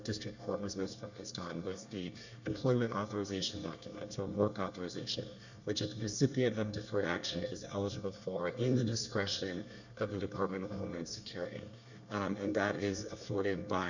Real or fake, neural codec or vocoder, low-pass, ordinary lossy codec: fake; codec, 24 kHz, 1 kbps, SNAC; 7.2 kHz; Opus, 64 kbps